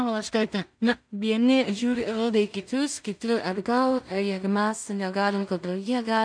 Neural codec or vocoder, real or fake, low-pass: codec, 16 kHz in and 24 kHz out, 0.4 kbps, LongCat-Audio-Codec, two codebook decoder; fake; 9.9 kHz